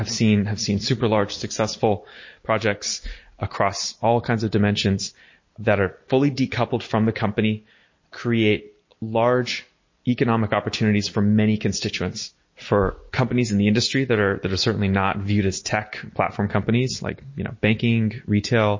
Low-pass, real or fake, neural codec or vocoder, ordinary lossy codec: 7.2 kHz; real; none; MP3, 32 kbps